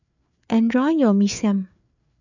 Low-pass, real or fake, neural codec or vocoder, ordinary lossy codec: 7.2 kHz; fake; codec, 16 kHz, 4 kbps, FreqCodec, larger model; none